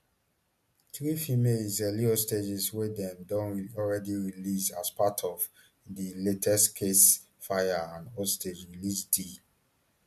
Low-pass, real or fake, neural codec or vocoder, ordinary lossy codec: 14.4 kHz; real; none; MP3, 96 kbps